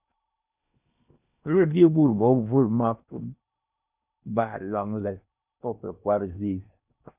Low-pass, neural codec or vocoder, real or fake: 3.6 kHz; codec, 16 kHz in and 24 kHz out, 0.8 kbps, FocalCodec, streaming, 65536 codes; fake